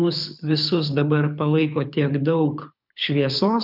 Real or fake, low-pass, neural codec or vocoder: fake; 5.4 kHz; codec, 24 kHz, 6 kbps, HILCodec